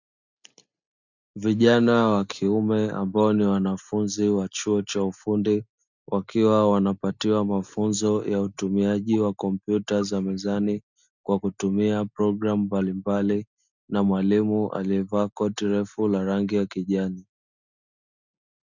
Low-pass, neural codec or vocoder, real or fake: 7.2 kHz; none; real